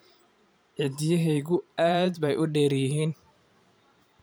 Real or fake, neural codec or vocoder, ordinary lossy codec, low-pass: fake; vocoder, 44.1 kHz, 128 mel bands every 512 samples, BigVGAN v2; none; none